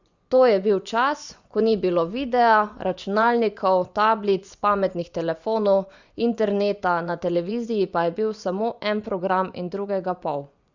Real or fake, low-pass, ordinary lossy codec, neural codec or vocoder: fake; 7.2 kHz; Opus, 64 kbps; vocoder, 24 kHz, 100 mel bands, Vocos